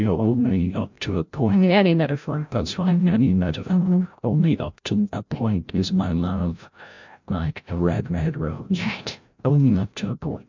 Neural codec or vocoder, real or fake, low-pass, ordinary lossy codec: codec, 16 kHz, 0.5 kbps, FreqCodec, larger model; fake; 7.2 kHz; MP3, 64 kbps